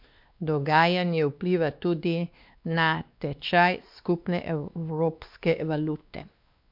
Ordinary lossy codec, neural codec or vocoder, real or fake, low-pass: MP3, 48 kbps; codec, 16 kHz, 2 kbps, X-Codec, WavLM features, trained on Multilingual LibriSpeech; fake; 5.4 kHz